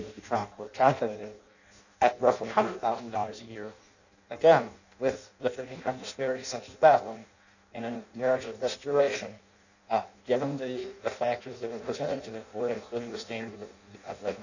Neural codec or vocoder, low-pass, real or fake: codec, 16 kHz in and 24 kHz out, 0.6 kbps, FireRedTTS-2 codec; 7.2 kHz; fake